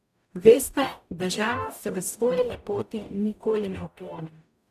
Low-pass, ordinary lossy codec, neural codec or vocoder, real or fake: 14.4 kHz; none; codec, 44.1 kHz, 0.9 kbps, DAC; fake